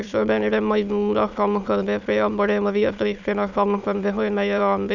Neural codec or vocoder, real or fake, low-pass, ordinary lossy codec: autoencoder, 22.05 kHz, a latent of 192 numbers a frame, VITS, trained on many speakers; fake; 7.2 kHz; none